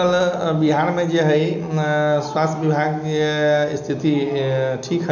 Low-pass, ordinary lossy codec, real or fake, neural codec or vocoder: 7.2 kHz; none; real; none